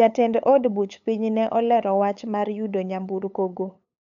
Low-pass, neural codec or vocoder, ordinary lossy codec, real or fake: 7.2 kHz; codec, 16 kHz, 8 kbps, FunCodec, trained on LibriTTS, 25 frames a second; none; fake